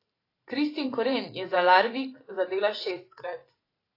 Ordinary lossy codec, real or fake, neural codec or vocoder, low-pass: AAC, 24 kbps; fake; vocoder, 44.1 kHz, 128 mel bands every 512 samples, BigVGAN v2; 5.4 kHz